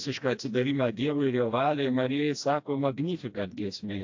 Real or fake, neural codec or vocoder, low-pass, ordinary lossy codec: fake; codec, 16 kHz, 1 kbps, FreqCodec, smaller model; 7.2 kHz; AAC, 48 kbps